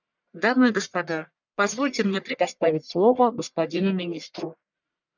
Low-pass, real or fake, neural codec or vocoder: 7.2 kHz; fake; codec, 44.1 kHz, 1.7 kbps, Pupu-Codec